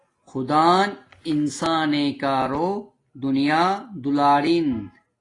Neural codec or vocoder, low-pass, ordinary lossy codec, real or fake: none; 10.8 kHz; AAC, 32 kbps; real